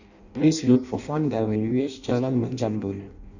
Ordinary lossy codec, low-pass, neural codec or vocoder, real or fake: none; 7.2 kHz; codec, 16 kHz in and 24 kHz out, 0.6 kbps, FireRedTTS-2 codec; fake